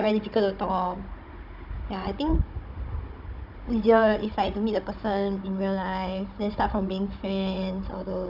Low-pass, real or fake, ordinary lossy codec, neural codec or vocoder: 5.4 kHz; fake; none; codec, 16 kHz, 16 kbps, FunCodec, trained on Chinese and English, 50 frames a second